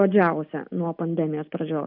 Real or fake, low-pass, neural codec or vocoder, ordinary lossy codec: real; 5.4 kHz; none; AAC, 48 kbps